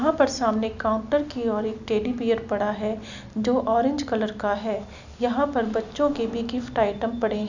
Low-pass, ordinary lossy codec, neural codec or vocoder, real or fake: 7.2 kHz; none; none; real